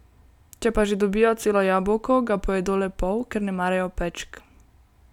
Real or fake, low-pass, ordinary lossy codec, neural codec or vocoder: real; 19.8 kHz; none; none